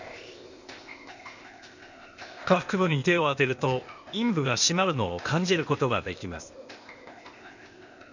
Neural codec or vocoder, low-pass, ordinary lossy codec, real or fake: codec, 16 kHz, 0.8 kbps, ZipCodec; 7.2 kHz; none; fake